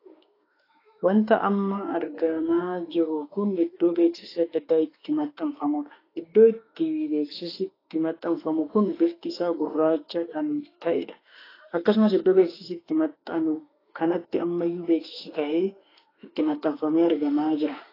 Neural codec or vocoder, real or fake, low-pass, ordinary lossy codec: autoencoder, 48 kHz, 32 numbers a frame, DAC-VAE, trained on Japanese speech; fake; 5.4 kHz; AAC, 24 kbps